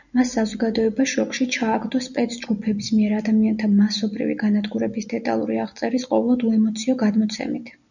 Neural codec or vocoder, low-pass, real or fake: none; 7.2 kHz; real